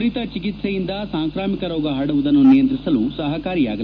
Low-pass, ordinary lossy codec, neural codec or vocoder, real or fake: 7.2 kHz; none; none; real